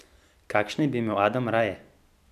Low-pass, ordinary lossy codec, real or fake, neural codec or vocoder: 14.4 kHz; none; real; none